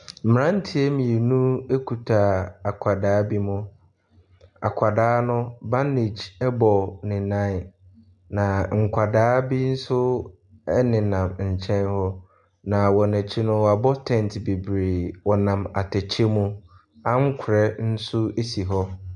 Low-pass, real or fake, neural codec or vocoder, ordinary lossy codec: 10.8 kHz; real; none; MP3, 96 kbps